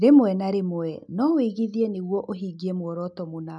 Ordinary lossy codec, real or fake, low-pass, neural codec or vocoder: none; real; 10.8 kHz; none